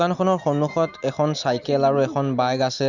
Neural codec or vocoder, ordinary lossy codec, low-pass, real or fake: none; none; 7.2 kHz; real